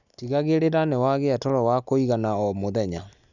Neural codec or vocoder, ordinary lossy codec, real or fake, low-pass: none; none; real; 7.2 kHz